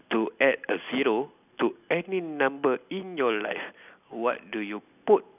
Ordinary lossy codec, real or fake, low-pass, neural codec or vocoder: none; real; 3.6 kHz; none